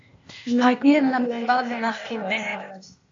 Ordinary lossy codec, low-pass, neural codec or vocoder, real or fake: MP3, 48 kbps; 7.2 kHz; codec, 16 kHz, 0.8 kbps, ZipCodec; fake